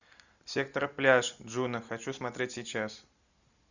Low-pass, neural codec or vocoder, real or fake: 7.2 kHz; none; real